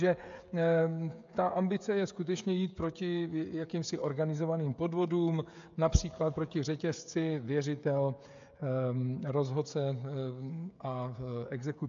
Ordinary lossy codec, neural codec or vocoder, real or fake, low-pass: AAC, 64 kbps; codec, 16 kHz, 16 kbps, FreqCodec, smaller model; fake; 7.2 kHz